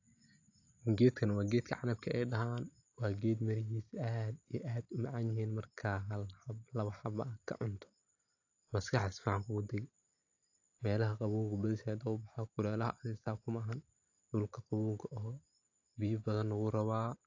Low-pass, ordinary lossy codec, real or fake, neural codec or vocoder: 7.2 kHz; AAC, 48 kbps; real; none